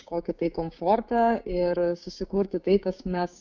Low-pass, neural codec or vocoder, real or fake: 7.2 kHz; codec, 16 kHz, 6 kbps, DAC; fake